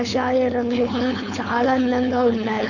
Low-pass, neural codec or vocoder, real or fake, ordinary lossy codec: 7.2 kHz; codec, 16 kHz, 4.8 kbps, FACodec; fake; none